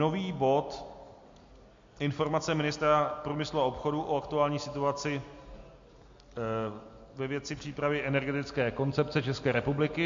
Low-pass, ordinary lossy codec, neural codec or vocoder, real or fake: 7.2 kHz; MP3, 48 kbps; none; real